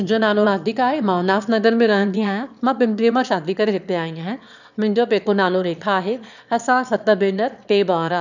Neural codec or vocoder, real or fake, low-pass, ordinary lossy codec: autoencoder, 22.05 kHz, a latent of 192 numbers a frame, VITS, trained on one speaker; fake; 7.2 kHz; none